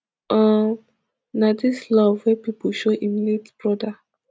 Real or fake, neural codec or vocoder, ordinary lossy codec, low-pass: real; none; none; none